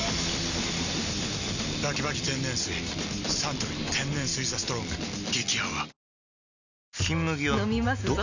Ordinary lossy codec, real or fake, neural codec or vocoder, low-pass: none; real; none; 7.2 kHz